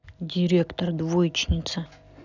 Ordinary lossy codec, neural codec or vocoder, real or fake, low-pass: none; none; real; 7.2 kHz